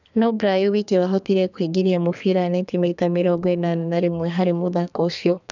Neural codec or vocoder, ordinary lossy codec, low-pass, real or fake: codec, 32 kHz, 1.9 kbps, SNAC; none; 7.2 kHz; fake